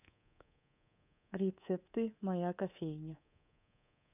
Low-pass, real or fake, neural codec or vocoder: 3.6 kHz; fake; codec, 24 kHz, 3.1 kbps, DualCodec